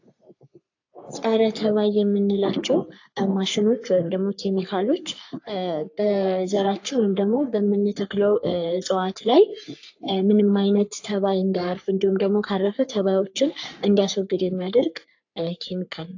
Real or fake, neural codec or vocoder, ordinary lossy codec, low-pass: fake; codec, 44.1 kHz, 3.4 kbps, Pupu-Codec; AAC, 48 kbps; 7.2 kHz